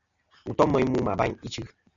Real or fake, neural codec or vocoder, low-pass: real; none; 7.2 kHz